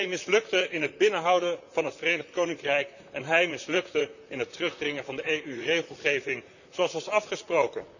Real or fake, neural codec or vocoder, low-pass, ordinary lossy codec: fake; vocoder, 44.1 kHz, 128 mel bands, Pupu-Vocoder; 7.2 kHz; none